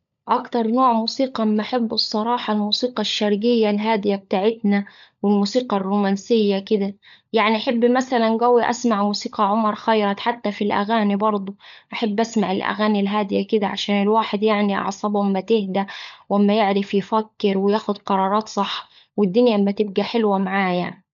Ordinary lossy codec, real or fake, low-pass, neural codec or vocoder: none; fake; 7.2 kHz; codec, 16 kHz, 16 kbps, FunCodec, trained on LibriTTS, 50 frames a second